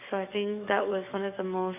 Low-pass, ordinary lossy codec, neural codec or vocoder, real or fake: 3.6 kHz; none; codec, 44.1 kHz, 7.8 kbps, DAC; fake